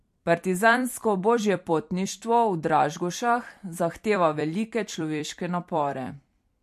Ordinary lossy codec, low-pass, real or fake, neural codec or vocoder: MP3, 64 kbps; 14.4 kHz; fake; vocoder, 44.1 kHz, 128 mel bands every 256 samples, BigVGAN v2